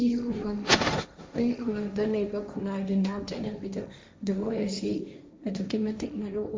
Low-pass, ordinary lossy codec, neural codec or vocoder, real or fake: none; none; codec, 16 kHz, 1.1 kbps, Voila-Tokenizer; fake